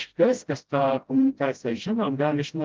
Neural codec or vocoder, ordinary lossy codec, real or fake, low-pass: codec, 16 kHz, 0.5 kbps, FreqCodec, smaller model; Opus, 24 kbps; fake; 7.2 kHz